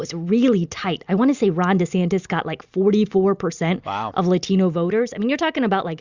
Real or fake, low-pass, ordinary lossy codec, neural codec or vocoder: real; 7.2 kHz; Opus, 64 kbps; none